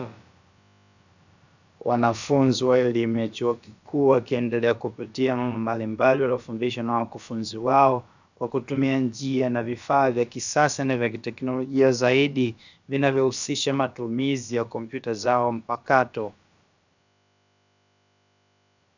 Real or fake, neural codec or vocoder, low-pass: fake; codec, 16 kHz, about 1 kbps, DyCAST, with the encoder's durations; 7.2 kHz